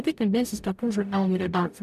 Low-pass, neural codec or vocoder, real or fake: 14.4 kHz; codec, 44.1 kHz, 0.9 kbps, DAC; fake